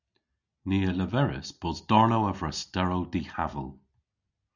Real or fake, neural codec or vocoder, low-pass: real; none; 7.2 kHz